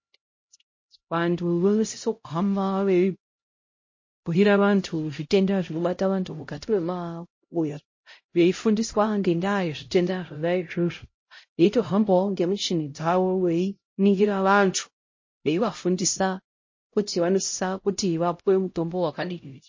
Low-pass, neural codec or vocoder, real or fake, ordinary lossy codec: 7.2 kHz; codec, 16 kHz, 0.5 kbps, X-Codec, HuBERT features, trained on LibriSpeech; fake; MP3, 32 kbps